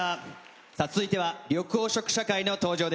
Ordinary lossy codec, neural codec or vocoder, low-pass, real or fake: none; none; none; real